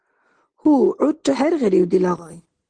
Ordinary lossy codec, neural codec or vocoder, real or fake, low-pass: Opus, 16 kbps; none; real; 9.9 kHz